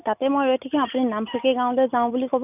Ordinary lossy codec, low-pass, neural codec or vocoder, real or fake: none; 3.6 kHz; none; real